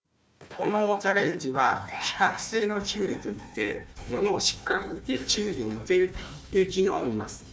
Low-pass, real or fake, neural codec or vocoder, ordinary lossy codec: none; fake; codec, 16 kHz, 1 kbps, FunCodec, trained on Chinese and English, 50 frames a second; none